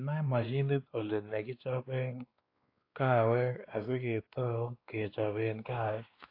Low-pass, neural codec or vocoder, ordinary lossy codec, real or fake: 5.4 kHz; codec, 16 kHz, 2 kbps, X-Codec, WavLM features, trained on Multilingual LibriSpeech; AAC, 32 kbps; fake